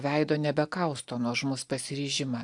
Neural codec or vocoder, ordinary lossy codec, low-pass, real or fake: none; AAC, 64 kbps; 10.8 kHz; real